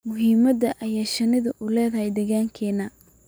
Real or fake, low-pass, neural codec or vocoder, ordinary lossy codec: real; none; none; none